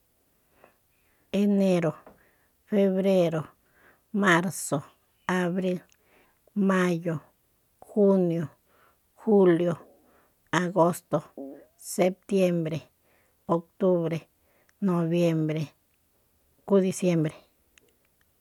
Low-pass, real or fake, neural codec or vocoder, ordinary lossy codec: 19.8 kHz; real; none; none